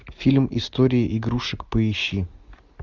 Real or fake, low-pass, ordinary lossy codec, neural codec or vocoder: real; 7.2 kHz; Opus, 64 kbps; none